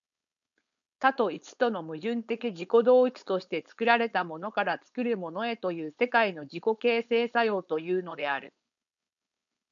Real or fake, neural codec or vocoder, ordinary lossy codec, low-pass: fake; codec, 16 kHz, 4.8 kbps, FACodec; MP3, 96 kbps; 7.2 kHz